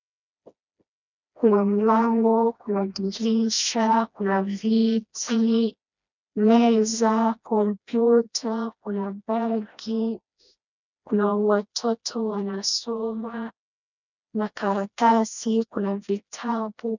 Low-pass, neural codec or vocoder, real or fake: 7.2 kHz; codec, 16 kHz, 1 kbps, FreqCodec, smaller model; fake